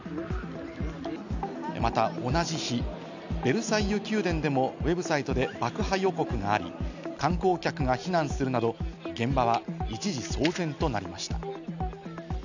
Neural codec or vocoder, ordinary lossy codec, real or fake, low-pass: none; none; real; 7.2 kHz